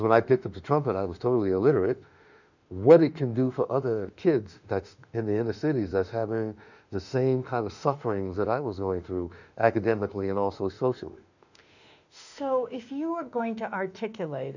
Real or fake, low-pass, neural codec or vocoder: fake; 7.2 kHz; autoencoder, 48 kHz, 32 numbers a frame, DAC-VAE, trained on Japanese speech